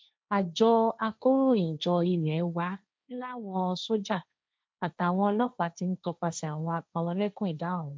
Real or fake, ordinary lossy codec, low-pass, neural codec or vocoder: fake; none; none; codec, 16 kHz, 1.1 kbps, Voila-Tokenizer